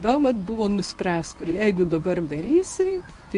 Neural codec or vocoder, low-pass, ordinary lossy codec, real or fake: codec, 24 kHz, 0.9 kbps, WavTokenizer, medium speech release version 1; 10.8 kHz; AAC, 64 kbps; fake